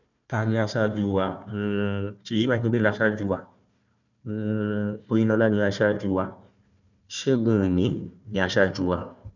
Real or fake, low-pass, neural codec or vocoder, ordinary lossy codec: fake; 7.2 kHz; codec, 16 kHz, 1 kbps, FunCodec, trained on Chinese and English, 50 frames a second; none